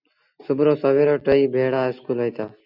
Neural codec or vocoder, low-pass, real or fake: none; 5.4 kHz; real